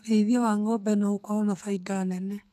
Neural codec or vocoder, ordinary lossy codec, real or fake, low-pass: codec, 44.1 kHz, 2.6 kbps, SNAC; none; fake; 14.4 kHz